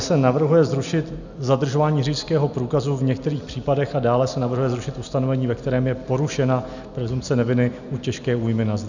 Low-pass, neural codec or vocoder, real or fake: 7.2 kHz; none; real